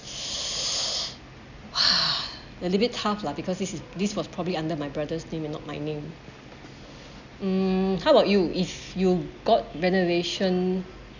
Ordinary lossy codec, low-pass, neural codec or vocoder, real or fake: none; 7.2 kHz; none; real